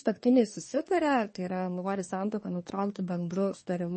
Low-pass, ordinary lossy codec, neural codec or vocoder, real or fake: 10.8 kHz; MP3, 32 kbps; codec, 24 kHz, 1 kbps, SNAC; fake